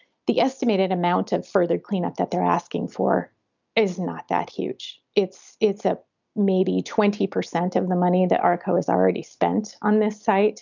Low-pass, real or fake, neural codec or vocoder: 7.2 kHz; real; none